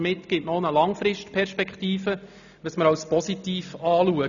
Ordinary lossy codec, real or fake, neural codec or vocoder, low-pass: none; real; none; 7.2 kHz